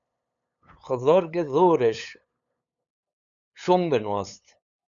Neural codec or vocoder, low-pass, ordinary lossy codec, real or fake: codec, 16 kHz, 8 kbps, FunCodec, trained on LibriTTS, 25 frames a second; 7.2 kHz; MP3, 96 kbps; fake